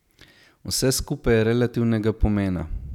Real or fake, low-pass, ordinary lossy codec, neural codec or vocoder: real; 19.8 kHz; none; none